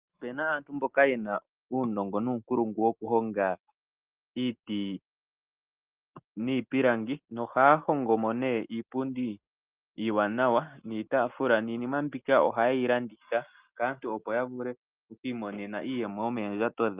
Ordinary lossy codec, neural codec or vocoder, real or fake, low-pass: Opus, 32 kbps; none; real; 3.6 kHz